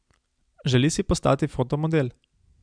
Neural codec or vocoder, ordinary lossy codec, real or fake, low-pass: none; MP3, 96 kbps; real; 9.9 kHz